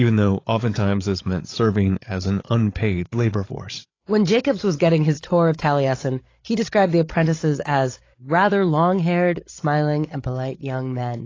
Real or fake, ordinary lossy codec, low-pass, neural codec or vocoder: fake; AAC, 32 kbps; 7.2 kHz; codec, 16 kHz, 8 kbps, FreqCodec, larger model